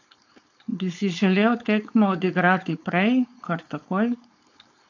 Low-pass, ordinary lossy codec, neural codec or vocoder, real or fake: 7.2 kHz; AAC, 48 kbps; codec, 16 kHz, 4.8 kbps, FACodec; fake